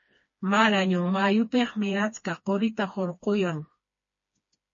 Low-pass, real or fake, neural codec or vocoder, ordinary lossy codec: 7.2 kHz; fake; codec, 16 kHz, 2 kbps, FreqCodec, smaller model; MP3, 32 kbps